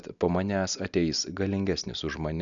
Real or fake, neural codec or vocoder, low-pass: real; none; 7.2 kHz